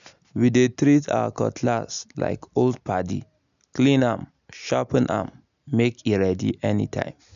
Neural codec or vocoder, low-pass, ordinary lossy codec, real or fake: none; 7.2 kHz; none; real